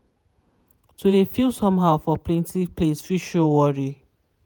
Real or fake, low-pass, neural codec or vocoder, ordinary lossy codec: fake; none; vocoder, 48 kHz, 128 mel bands, Vocos; none